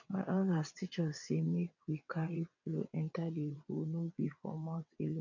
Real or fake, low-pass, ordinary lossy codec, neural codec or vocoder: fake; 7.2 kHz; none; vocoder, 22.05 kHz, 80 mel bands, WaveNeXt